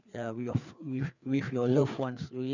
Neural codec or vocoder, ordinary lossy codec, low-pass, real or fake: codec, 16 kHz, 4 kbps, FreqCodec, larger model; none; 7.2 kHz; fake